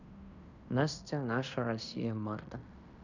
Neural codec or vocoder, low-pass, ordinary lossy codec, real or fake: codec, 16 kHz in and 24 kHz out, 0.9 kbps, LongCat-Audio-Codec, fine tuned four codebook decoder; 7.2 kHz; none; fake